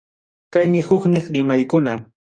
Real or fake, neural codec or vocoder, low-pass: fake; codec, 44.1 kHz, 2.6 kbps, DAC; 9.9 kHz